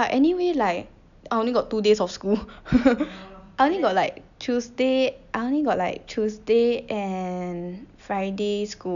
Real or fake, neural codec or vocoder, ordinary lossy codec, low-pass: real; none; none; 7.2 kHz